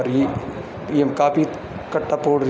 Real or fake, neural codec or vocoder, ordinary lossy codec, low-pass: real; none; none; none